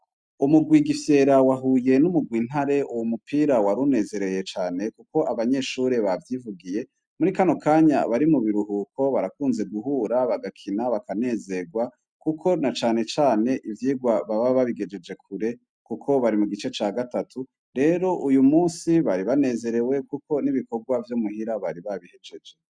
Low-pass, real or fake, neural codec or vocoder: 9.9 kHz; real; none